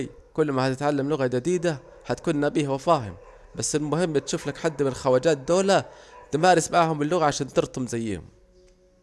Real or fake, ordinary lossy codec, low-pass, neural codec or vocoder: real; none; none; none